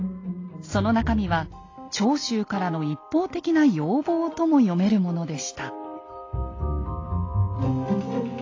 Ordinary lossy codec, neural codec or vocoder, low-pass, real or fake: AAC, 32 kbps; none; 7.2 kHz; real